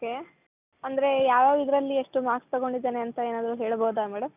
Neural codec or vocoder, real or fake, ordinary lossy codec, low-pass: none; real; none; 3.6 kHz